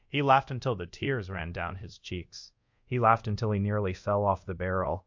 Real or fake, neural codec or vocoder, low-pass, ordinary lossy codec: fake; codec, 24 kHz, 0.5 kbps, DualCodec; 7.2 kHz; MP3, 48 kbps